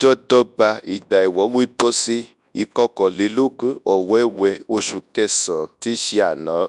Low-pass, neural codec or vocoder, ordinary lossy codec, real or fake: 10.8 kHz; codec, 24 kHz, 0.9 kbps, WavTokenizer, large speech release; none; fake